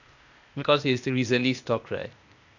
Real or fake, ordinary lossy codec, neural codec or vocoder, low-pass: fake; none; codec, 16 kHz, 0.8 kbps, ZipCodec; 7.2 kHz